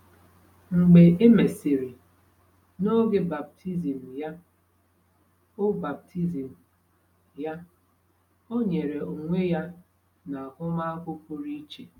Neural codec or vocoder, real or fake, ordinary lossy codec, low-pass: none; real; none; 19.8 kHz